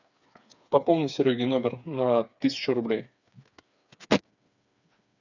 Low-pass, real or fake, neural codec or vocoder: 7.2 kHz; fake; codec, 16 kHz, 4 kbps, FreqCodec, smaller model